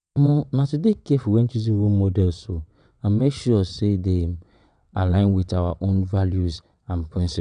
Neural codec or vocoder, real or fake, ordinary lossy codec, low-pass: vocoder, 22.05 kHz, 80 mel bands, WaveNeXt; fake; none; 9.9 kHz